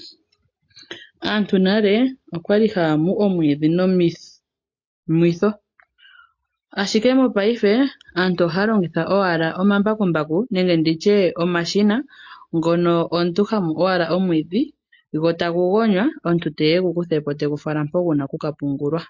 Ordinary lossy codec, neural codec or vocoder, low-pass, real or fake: MP3, 48 kbps; none; 7.2 kHz; real